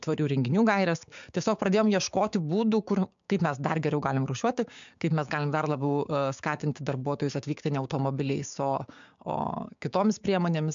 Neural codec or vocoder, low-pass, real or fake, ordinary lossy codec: codec, 16 kHz, 6 kbps, DAC; 7.2 kHz; fake; MP3, 64 kbps